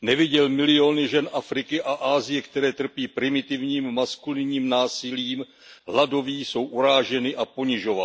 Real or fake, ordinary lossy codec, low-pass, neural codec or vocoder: real; none; none; none